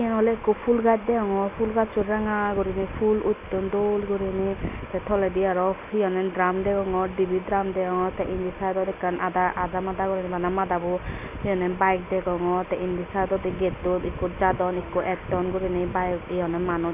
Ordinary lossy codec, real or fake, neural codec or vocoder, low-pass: none; real; none; 3.6 kHz